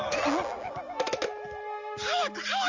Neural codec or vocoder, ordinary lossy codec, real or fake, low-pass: none; Opus, 32 kbps; real; 7.2 kHz